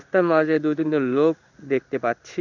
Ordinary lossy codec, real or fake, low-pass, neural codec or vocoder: none; fake; 7.2 kHz; codec, 16 kHz in and 24 kHz out, 1 kbps, XY-Tokenizer